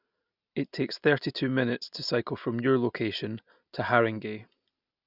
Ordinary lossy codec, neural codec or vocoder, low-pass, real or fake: none; none; 5.4 kHz; real